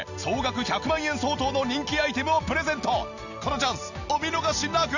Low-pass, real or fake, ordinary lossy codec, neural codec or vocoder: 7.2 kHz; real; none; none